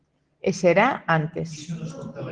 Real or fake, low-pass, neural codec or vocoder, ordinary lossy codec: real; 9.9 kHz; none; Opus, 16 kbps